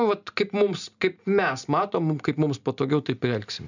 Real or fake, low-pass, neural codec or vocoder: real; 7.2 kHz; none